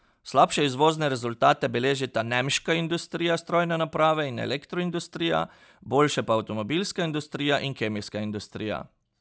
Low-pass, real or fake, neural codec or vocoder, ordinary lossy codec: none; real; none; none